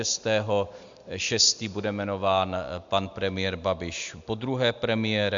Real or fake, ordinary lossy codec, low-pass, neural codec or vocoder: real; MP3, 64 kbps; 7.2 kHz; none